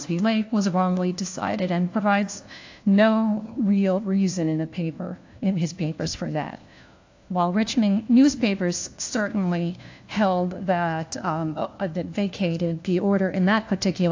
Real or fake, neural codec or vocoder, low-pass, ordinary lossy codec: fake; codec, 16 kHz, 1 kbps, FunCodec, trained on LibriTTS, 50 frames a second; 7.2 kHz; AAC, 48 kbps